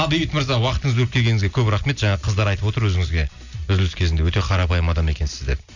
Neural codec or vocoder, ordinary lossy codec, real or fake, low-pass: none; none; real; 7.2 kHz